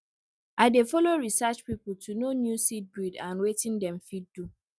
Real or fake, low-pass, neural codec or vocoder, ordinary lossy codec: real; none; none; none